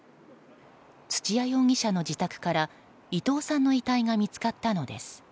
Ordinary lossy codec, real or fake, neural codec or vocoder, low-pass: none; real; none; none